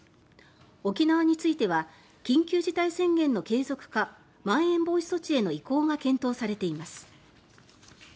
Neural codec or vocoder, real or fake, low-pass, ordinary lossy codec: none; real; none; none